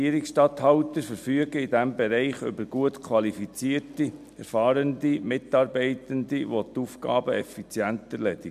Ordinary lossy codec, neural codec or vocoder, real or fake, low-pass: MP3, 96 kbps; none; real; 14.4 kHz